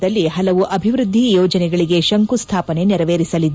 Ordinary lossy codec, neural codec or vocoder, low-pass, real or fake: none; none; none; real